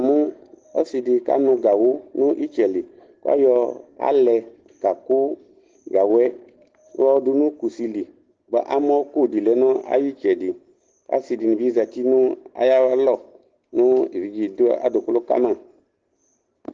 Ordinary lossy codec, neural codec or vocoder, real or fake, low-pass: Opus, 16 kbps; none; real; 7.2 kHz